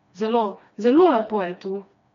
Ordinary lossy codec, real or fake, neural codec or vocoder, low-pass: MP3, 64 kbps; fake; codec, 16 kHz, 2 kbps, FreqCodec, smaller model; 7.2 kHz